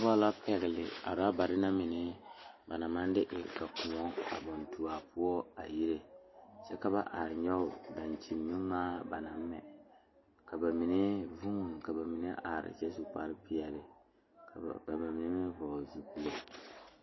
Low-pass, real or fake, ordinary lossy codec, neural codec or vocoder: 7.2 kHz; real; MP3, 24 kbps; none